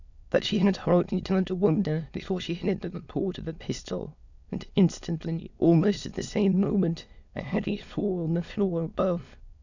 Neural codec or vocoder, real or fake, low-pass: autoencoder, 22.05 kHz, a latent of 192 numbers a frame, VITS, trained on many speakers; fake; 7.2 kHz